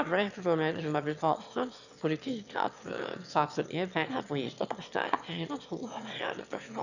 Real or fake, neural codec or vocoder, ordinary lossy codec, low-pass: fake; autoencoder, 22.05 kHz, a latent of 192 numbers a frame, VITS, trained on one speaker; none; 7.2 kHz